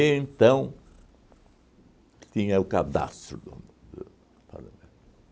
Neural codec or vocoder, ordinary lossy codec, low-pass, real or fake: none; none; none; real